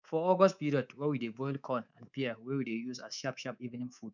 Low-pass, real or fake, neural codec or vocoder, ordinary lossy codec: 7.2 kHz; fake; codec, 24 kHz, 3.1 kbps, DualCodec; none